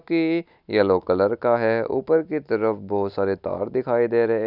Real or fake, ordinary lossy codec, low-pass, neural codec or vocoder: real; none; 5.4 kHz; none